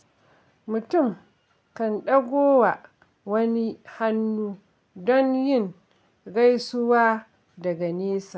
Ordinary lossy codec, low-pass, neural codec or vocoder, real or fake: none; none; none; real